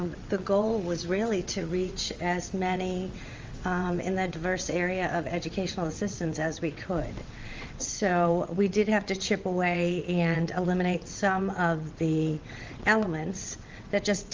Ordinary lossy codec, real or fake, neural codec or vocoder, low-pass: Opus, 32 kbps; fake; vocoder, 22.05 kHz, 80 mel bands, WaveNeXt; 7.2 kHz